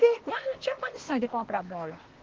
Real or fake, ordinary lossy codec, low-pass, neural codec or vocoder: fake; Opus, 16 kbps; 7.2 kHz; codec, 16 kHz, 0.8 kbps, ZipCodec